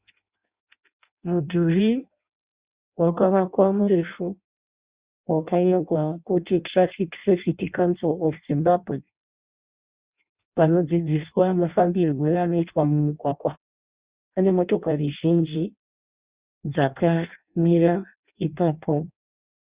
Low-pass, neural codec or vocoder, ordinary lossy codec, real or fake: 3.6 kHz; codec, 16 kHz in and 24 kHz out, 0.6 kbps, FireRedTTS-2 codec; Opus, 64 kbps; fake